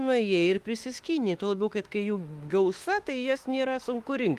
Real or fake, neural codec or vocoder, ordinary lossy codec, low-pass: fake; autoencoder, 48 kHz, 32 numbers a frame, DAC-VAE, trained on Japanese speech; Opus, 24 kbps; 14.4 kHz